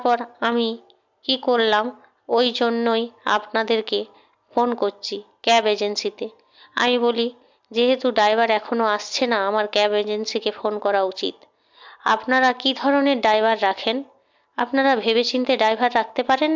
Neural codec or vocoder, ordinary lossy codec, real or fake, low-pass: none; MP3, 64 kbps; real; 7.2 kHz